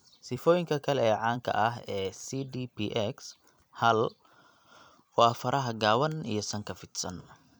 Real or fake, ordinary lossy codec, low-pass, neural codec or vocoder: fake; none; none; vocoder, 44.1 kHz, 128 mel bands every 256 samples, BigVGAN v2